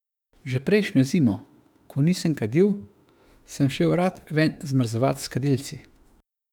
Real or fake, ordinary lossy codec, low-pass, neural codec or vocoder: fake; none; 19.8 kHz; autoencoder, 48 kHz, 32 numbers a frame, DAC-VAE, trained on Japanese speech